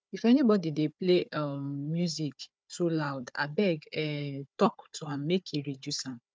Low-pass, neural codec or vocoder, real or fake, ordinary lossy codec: none; codec, 16 kHz, 4 kbps, FunCodec, trained on Chinese and English, 50 frames a second; fake; none